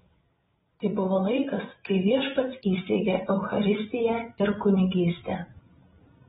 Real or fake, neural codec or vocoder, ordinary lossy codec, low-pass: fake; codec, 16 kHz, 16 kbps, FreqCodec, larger model; AAC, 16 kbps; 7.2 kHz